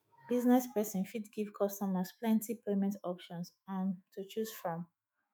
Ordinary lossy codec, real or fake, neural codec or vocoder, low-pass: none; fake; autoencoder, 48 kHz, 128 numbers a frame, DAC-VAE, trained on Japanese speech; none